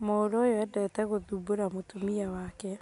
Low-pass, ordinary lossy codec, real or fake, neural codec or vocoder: 10.8 kHz; none; real; none